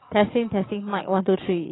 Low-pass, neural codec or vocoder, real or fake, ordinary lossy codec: 7.2 kHz; codec, 44.1 kHz, 7.8 kbps, DAC; fake; AAC, 16 kbps